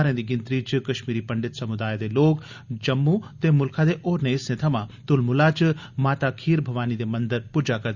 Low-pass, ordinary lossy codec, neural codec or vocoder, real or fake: 7.2 kHz; Opus, 64 kbps; none; real